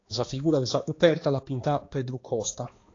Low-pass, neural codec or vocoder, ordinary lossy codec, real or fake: 7.2 kHz; codec, 16 kHz, 2 kbps, X-Codec, HuBERT features, trained on balanced general audio; AAC, 32 kbps; fake